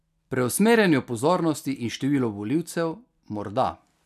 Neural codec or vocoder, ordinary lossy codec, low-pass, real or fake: none; none; 14.4 kHz; real